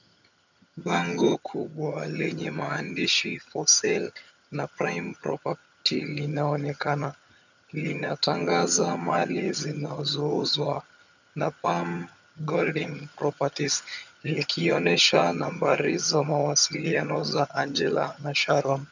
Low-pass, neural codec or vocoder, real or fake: 7.2 kHz; vocoder, 22.05 kHz, 80 mel bands, HiFi-GAN; fake